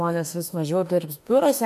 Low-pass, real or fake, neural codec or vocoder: 14.4 kHz; fake; autoencoder, 48 kHz, 32 numbers a frame, DAC-VAE, trained on Japanese speech